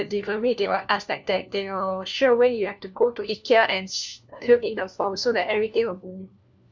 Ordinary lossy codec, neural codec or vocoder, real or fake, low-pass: none; codec, 16 kHz, 1 kbps, FunCodec, trained on LibriTTS, 50 frames a second; fake; none